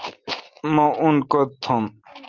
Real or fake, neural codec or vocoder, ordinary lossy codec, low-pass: real; none; Opus, 32 kbps; 7.2 kHz